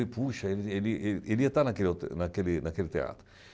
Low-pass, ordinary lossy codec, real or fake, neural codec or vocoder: none; none; real; none